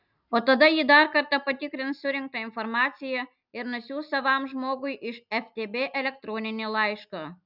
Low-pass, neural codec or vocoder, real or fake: 5.4 kHz; none; real